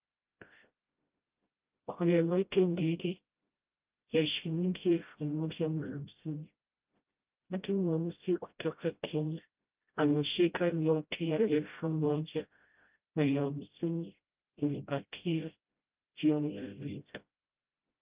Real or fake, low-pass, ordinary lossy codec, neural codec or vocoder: fake; 3.6 kHz; Opus, 24 kbps; codec, 16 kHz, 0.5 kbps, FreqCodec, smaller model